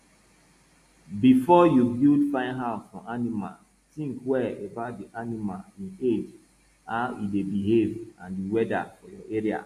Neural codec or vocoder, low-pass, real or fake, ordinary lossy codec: none; 14.4 kHz; real; AAC, 64 kbps